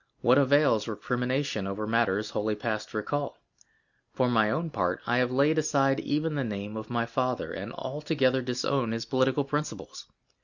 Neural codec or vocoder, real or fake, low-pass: none; real; 7.2 kHz